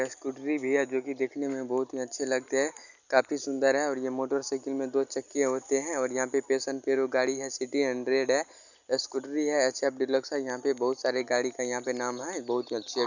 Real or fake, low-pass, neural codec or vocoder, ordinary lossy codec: real; 7.2 kHz; none; none